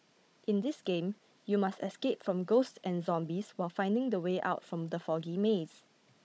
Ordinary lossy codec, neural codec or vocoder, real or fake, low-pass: none; codec, 16 kHz, 16 kbps, FunCodec, trained on Chinese and English, 50 frames a second; fake; none